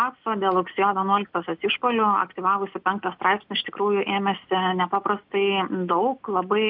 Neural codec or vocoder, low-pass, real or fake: none; 5.4 kHz; real